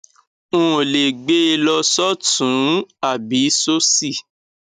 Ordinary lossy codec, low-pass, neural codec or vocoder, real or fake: none; 14.4 kHz; none; real